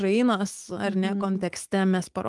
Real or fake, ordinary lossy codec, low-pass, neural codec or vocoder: fake; Opus, 24 kbps; 10.8 kHz; autoencoder, 48 kHz, 128 numbers a frame, DAC-VAE, trained on Japanese speech